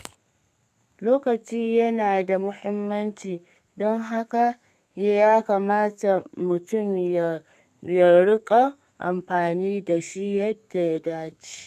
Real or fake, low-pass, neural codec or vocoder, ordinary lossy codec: fake; 14.4 kHz; codec, 32 kHz, 1.9 kbps, SNAC; none